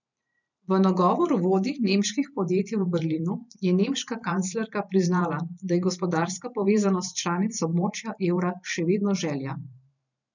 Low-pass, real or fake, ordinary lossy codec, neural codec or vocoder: 7.2 kHz; fake; none; vocoder, 44.1 kHz, 128 mel bands every 512 samples, BigVGAN v2